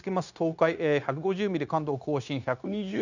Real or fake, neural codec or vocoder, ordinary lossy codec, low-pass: fake; codec, 16 kHz, 0.9 kbps, LongCat-Audio-Codec; none; 7.2 kHz